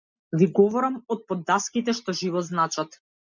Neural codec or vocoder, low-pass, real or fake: none; 7.2 kHz; real